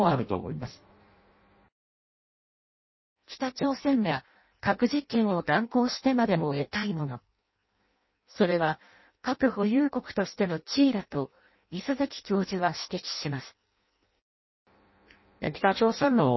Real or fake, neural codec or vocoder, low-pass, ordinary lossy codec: fake; codec, 16 kHz in and 24 kHz out, 0.6 kbps, FireRedTTS-2 codec; 7.2 kHz; MP3, 24 kbps